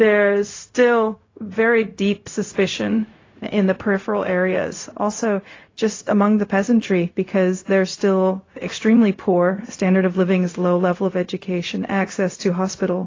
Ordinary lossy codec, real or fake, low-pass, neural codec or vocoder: AAC, 32 kbps; fake; 7.2 kHz; codec, 16 kHz, 0.4 kbps, LongCat-Audio-Codec